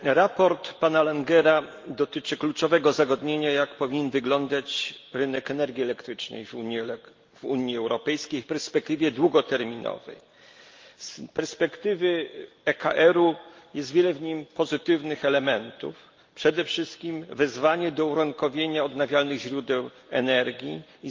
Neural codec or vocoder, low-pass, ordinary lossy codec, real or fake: none; 7.2 kHz; Opus, 32 kbps; real